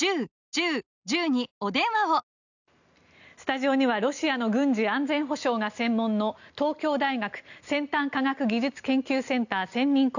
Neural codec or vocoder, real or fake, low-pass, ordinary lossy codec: none; real; 7.2 kHz; none